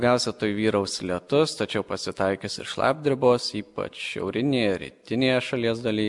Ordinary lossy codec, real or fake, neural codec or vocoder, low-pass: MP3, 96 kbps; real; none; 10.8 kHz